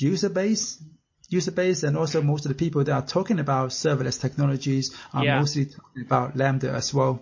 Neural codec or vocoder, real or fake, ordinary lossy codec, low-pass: none; real; MP3, 32 kbps; 7.2 kHz